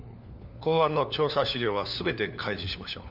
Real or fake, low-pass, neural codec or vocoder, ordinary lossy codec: fake; 5.4 kHz; codec, 16 kHz, 4 kbps, FunCodec, trained on LibriTTS, 50 frames a second; none